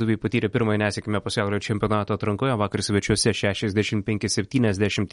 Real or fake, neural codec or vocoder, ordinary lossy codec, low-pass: real; none; MP3, 48 kbps; 14.4 kHz